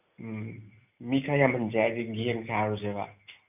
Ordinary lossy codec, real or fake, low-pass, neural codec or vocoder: none; real; 3.6 kHz; none